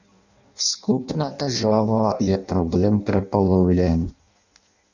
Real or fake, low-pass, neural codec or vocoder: fake; 7.2 kHz; codec, 16 kHz in and 24 kHz out, 0.6 kbps, FireRedTTS-2 codec